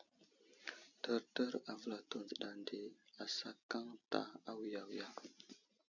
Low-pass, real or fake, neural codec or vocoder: 7.2 kHz; real; none